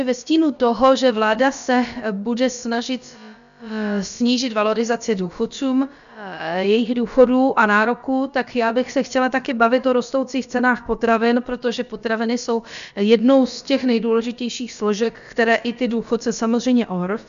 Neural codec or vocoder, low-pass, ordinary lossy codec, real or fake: codec, 16 kHz, about 1 kbps, DyCAST, with the encoder's durations; 7.2 kHz; MP3, 96 kbps; fake